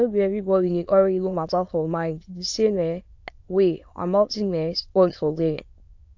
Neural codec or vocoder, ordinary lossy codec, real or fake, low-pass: autoencoder, 22.05 kHz, a latent of 192 numbers a frame, VITS, trained on many speakers; AAC, 48 kbps; fake; 7.2 kHz